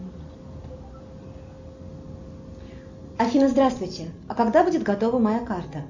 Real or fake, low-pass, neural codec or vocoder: real; 7.2 kHz; none